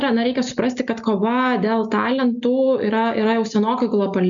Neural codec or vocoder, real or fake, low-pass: none; real; 7.2 kHz